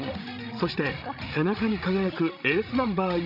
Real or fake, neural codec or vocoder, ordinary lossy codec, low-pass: fake; codec, 16 kHz, 16 kbps, FreqCodec, larger model; none; 5.4 kHz